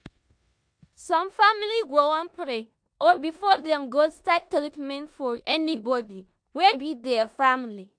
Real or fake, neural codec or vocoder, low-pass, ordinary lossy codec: fake; codec, 16 kHz in and 24 kHz out, 0.9 kbps, LongCat-Audio-Codec, four codebook decoder; 9.9 kHz; MP3, 64 kbps